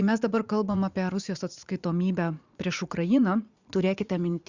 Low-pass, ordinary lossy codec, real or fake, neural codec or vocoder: 7.2 kHz; Opus, 64 kbps; fake; vocoder, 44.1 kHz, 80 mel bands, Vocos